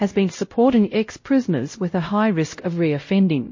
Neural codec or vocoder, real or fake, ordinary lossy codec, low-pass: codec, 16 kHz, 0.5 kbps, X-Codec, WavLM features, trained on Multilingual LibriSpeech; fake; MP3, 32 kbps; 7.2 kHz